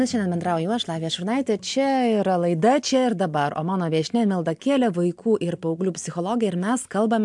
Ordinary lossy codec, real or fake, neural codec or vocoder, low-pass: MP3, 64 kbps; real; none; 10.8 kHz